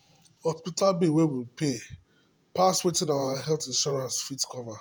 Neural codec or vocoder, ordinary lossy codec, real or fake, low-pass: vocoder, 48 kHz, 128 mel bands, Vocos; none; fake; none